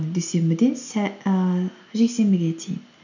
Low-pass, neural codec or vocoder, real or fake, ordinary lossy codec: 7.2 kHz; none; real; none